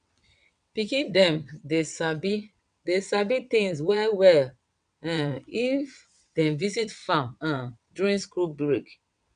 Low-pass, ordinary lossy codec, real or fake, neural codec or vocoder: 9.9 kHz; none; fake; vocoder, 22.05 kHz, 80 mel bands, WaveNeXt